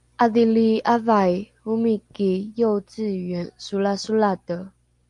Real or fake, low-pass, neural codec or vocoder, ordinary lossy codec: real; 10.8 kHz; none; Opus, 32 kbps